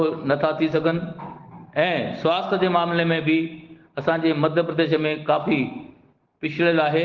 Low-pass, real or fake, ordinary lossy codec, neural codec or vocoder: 7.2 kHz; real; Opus, 16 kbps; none